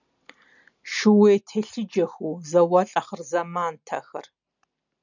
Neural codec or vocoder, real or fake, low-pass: none; real; 7.2 kHz